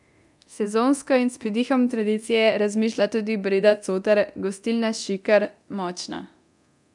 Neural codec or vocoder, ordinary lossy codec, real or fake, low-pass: codec, 24 kHz, 0.9 kbps, DualCodec; none; fake; 10.8 kHz